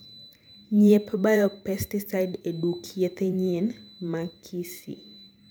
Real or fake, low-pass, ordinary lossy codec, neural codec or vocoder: fake; none; none; vocoder, 44.1 kHz, 128 mel bands every 512 samples, BigVGAN v2